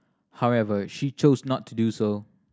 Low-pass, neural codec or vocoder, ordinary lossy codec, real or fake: none; none; none; real